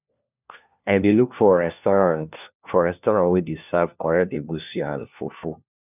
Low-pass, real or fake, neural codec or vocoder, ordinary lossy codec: 3.6 kHz; fake; codec, 16 kHz, 1 kbps, FunCodec, trained on LibriTTS, 50 frames a second; none